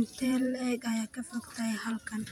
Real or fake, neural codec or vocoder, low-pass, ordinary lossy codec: fake; vocoder, 48 kHz, 128 mel bands, Vocos; 19.8 kHz; none